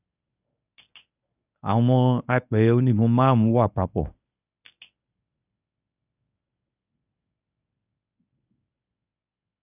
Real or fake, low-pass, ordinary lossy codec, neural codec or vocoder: fake; 3.6 kHz; none; codec, 24 kHz, 0.9 kbps, WavTokenizer, medium speech release version 1